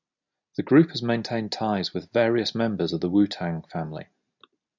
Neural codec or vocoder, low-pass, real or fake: none; 7.2 kHz; real